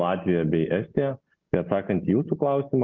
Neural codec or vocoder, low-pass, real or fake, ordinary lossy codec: none; 7.2 kHz; real; Opus, 24 kbps